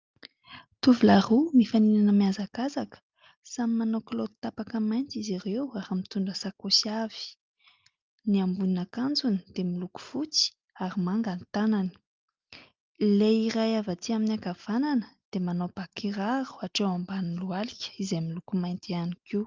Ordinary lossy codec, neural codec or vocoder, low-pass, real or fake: Opus, 32 kbps; none; 7.2 kHz; real